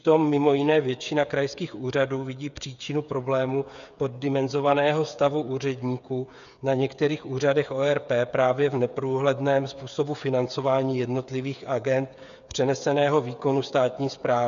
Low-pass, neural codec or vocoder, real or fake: 7.2 kHz; codec, 16 kHz, 8 kbps, FreqCodec, smaller model; fake